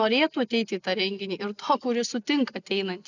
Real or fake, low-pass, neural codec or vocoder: real; 7.2 kHz; none